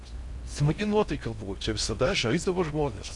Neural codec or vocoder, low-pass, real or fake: codec, 16 kHz in and 24 kHz out, 0.6 kbps, FocalCodec, streaming, 4096 codes; 10.8 kHz; fake